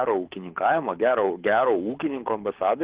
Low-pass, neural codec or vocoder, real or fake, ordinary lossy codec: 3.6 kHz; codec, 24 kHz, 6 kbps, HILCodec; fake; Opus, 16 kbps